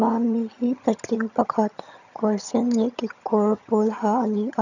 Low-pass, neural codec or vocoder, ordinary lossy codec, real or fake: 7.2 kHz; vocoder, 22.05 kHz, 80 mel bands, HiFi-GAN; none; fake